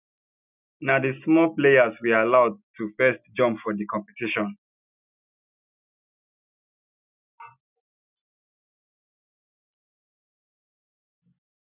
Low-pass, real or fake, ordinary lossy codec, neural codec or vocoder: 3.6 kHz; real; none; none